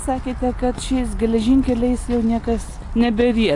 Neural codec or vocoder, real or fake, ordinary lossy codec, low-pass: none; real; AAC, 48 kbps; 10.8 kHz